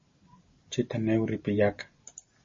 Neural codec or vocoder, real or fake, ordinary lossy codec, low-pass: none; real; MP3, 32 kbps; 7.2 kHz